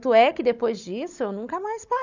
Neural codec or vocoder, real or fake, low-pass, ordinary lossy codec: codec, 16 kHz, 16 kbps, FunCodec, trained on Chinese and English, 50 frames a second; fake; 7.2 kHz; none